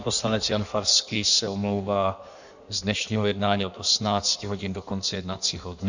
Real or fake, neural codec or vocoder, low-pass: fake; codec, 16 kHz in and 24 kHz out, 1.1 kbps, FireRedTTS-2 codec; 7.2 kHz